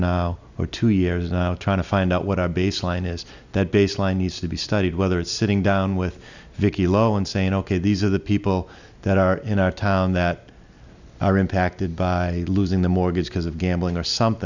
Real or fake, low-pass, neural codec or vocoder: real; 7.2 kHz; none